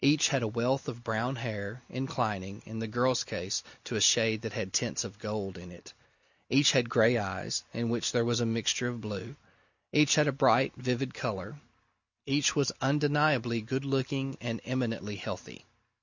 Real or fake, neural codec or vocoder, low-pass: real; none; 7.2 kHz